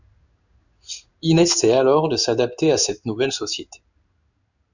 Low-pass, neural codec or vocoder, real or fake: 7.2 kHz; codec, 16 kHz in and 24 kHz out, 1 kbps, XY-Tokenizer; fake